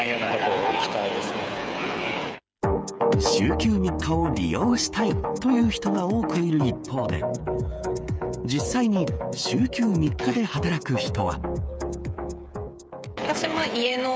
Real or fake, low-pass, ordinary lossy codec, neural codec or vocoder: fake; none; none; codec, 16 kHz, 8 kbps, FreqCodec, smaller model